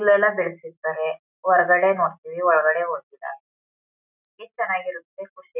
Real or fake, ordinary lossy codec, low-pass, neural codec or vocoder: real; none; 3.6 kHz; none